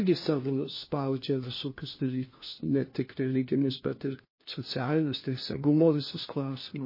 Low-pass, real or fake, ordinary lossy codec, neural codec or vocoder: 5.4 kHz; fake; MP3, 24 kbps; codec, 16 kHz, 1 kbps, FunCodec, trained on LibriTTS, 50 frames a second